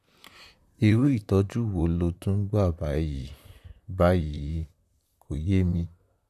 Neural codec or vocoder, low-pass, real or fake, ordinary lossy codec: vocoder, 44.1 kHz, 128 mel bands, Pupu-Vocoder; 14.4 kHz; fake; none